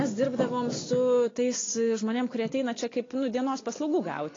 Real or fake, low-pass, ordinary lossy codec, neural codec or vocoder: real; 7.2 kHz; AAC, 32 kbps; none